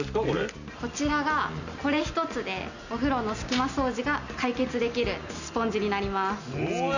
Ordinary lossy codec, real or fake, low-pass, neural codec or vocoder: none; real; 7.2 kHz; none